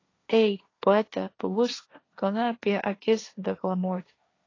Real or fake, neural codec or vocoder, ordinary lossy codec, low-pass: fake; codec, 16 kHz, 1.1 kbps, Voila-Tokenizer; AAC, 32 kbps; 7.2 kHz